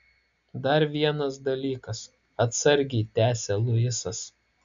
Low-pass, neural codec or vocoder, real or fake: 7.2 kHz; none; real